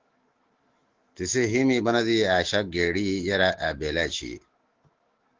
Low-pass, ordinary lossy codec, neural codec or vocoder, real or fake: 7.2 kHz; Opus, 16 kbps; autoencoder, 48 kHz, 128 numbers a frame, DAC-VAE, trained on Japanese speech; fake